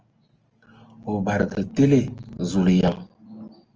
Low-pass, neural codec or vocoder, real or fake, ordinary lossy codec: 7.2 kHz; none; real; Opus, 24 kbps